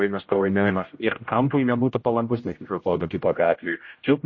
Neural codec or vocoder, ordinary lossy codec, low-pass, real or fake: codec, 16 kHz, 0.5 kbps, X-Codec, HuBERT features, trained on general audio; MP3, 32 kbps; 7.2 kHz; fake